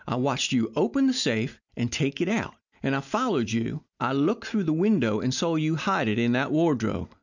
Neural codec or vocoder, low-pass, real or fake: none; 7.2 kHz; real